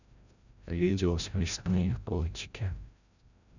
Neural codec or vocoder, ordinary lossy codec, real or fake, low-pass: codec, 16 kHz, 0.5 kbps, FreqCodec, larger model; none; fake; 7.2 kHz